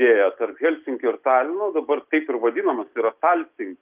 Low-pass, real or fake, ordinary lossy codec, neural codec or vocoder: 3.6 kHz; real; Opus, 32 kbps; none